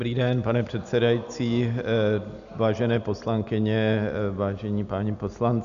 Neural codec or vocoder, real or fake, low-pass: none; real; 7.2 kHz